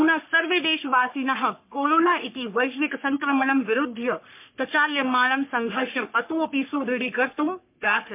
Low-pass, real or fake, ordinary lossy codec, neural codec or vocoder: 3.6 kHz; fake; MP3, 32 kbps; codec, 44.1 kHz, 3.4 kbps, Pupu-Codec